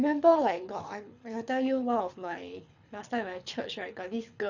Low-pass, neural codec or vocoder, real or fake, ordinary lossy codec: 7.2 kHz; codec, 24 kHz, 6 kbps, HILCodec; fake; none